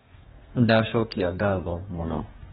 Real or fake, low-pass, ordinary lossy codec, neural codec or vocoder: fake; 14.4 kHz; AAC, 16 kbps; codec, 32 kHz, 1.9 kbps, SNAC